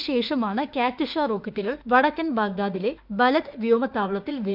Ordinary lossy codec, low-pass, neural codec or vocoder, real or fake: none; 5.4 kHz; autoencoder, 48 kHz, 32 numbers a frame, DAC-VAE, trained on Japanese speech; fake